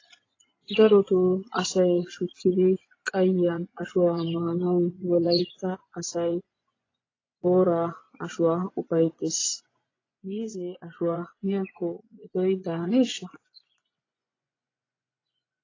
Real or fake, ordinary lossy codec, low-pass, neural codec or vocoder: fake; AAC, 32 kbps; 7.2 kHz; vocoder, 24 kHz, 100 mel bands, Vocos